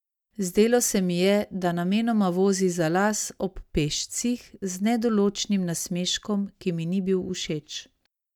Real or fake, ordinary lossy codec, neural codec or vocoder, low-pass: real; none; none; 19.8 kHz